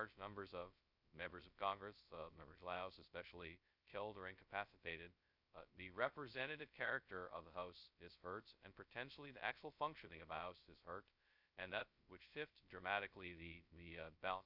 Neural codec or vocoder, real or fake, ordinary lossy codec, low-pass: codec, 16 kHz, 0.2 kbps, FocalCodec; fake; Opus, 64 kbps; 5.4 kHz